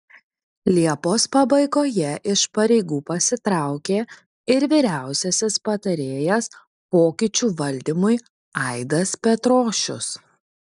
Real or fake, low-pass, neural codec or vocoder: real; 10.8 kHz; none